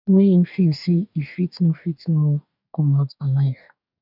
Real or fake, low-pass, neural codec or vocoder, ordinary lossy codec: fake; 5.4 kHz; codec, 44.1 kHz, 2.6 kbps, DAC; none